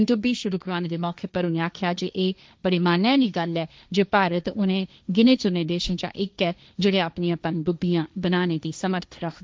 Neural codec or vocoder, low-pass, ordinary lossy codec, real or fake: codec, 16 kHz, 1.1 kbps, Voila-Tokenizer; none; none; fake